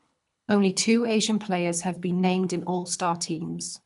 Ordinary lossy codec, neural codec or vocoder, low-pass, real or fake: none; codec, 24 kHz, 3 kbps, HILCodec; 10.8 kHz; fake